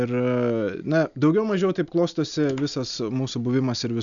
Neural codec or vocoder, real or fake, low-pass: none; real; 7.2 kHz